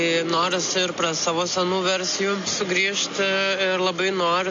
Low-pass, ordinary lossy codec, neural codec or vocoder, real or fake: 7.2 kHz; AAC, 64 kbps; none; real